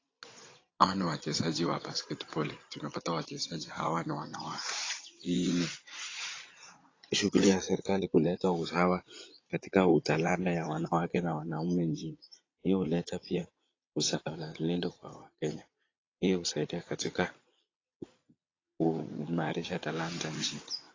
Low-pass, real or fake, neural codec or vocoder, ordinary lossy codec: 7.2 kHz; fake; vocoder, 22.05 kHz, 80 mel bands, Vocos; AAC, 32 kbps